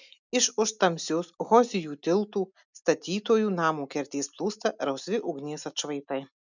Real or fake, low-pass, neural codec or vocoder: real; 7.2 kHz; none